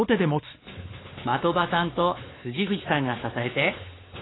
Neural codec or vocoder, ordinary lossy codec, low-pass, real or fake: codec, 16 kHz, 4 kbps, X-Codec, WavLM features, trained on Multilingual LibriSpeech; AAC, 16 kbps; 7.2 kHz; fake